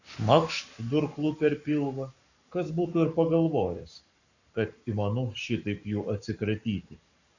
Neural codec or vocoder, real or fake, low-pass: codec, 44.1 kHz, 7.8 kbps, Pupu-Codec; fake; 7.2 kHz